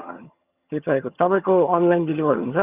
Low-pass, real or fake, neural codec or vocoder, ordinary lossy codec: 3.6 kHz; fake; vocoder, 22.05 kHz, 80 mel bands, HiFi-GAN; Opus, 64 kbps